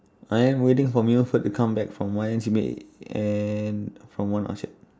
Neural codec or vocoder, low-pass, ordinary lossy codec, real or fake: none; none; none; real